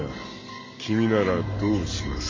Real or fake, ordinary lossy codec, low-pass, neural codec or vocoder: real; MP3, 32 kbps; 7.2 kHz; none